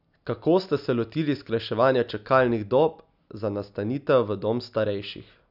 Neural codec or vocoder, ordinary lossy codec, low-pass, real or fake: none; none; 5.4 kHz; real